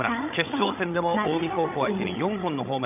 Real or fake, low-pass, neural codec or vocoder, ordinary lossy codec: fake; 3.6 kHz; codec, 16 kHz, 16 kbps, FreqCodec, larger model; none